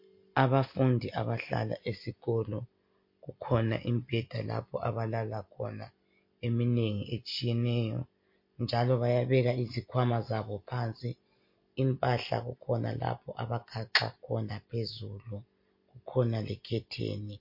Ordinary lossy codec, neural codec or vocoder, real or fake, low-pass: MP3, 32 kbps; none; real; 5.4 kHz